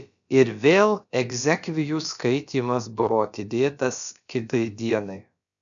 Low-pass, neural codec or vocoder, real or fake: 7.2 kHz; codec, 16 kHz, about 1 kbps, DyCAST, with the encoder's durations; fake